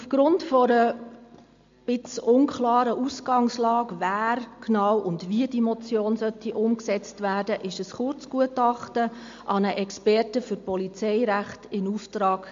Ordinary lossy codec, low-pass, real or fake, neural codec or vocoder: MP3, 48 kbps; 7.2 kHz; real; none